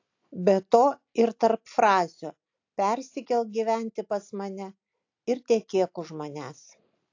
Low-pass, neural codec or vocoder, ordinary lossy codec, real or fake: 7.2 kHz; none; AAC, 48 kbps; real